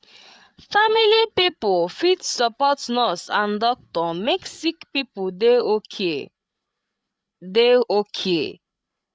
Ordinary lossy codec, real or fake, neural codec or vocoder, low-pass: none; fake; codec, 16 kHz, 8 kbps, FreqCodec, larger model; none